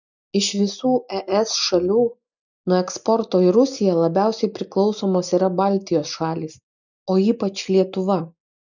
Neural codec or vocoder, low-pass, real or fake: none; 7.2 kHz; real